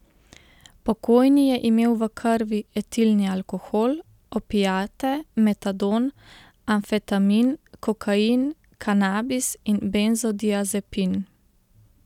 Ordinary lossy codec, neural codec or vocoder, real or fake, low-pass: none; none; real; 19.8 kHz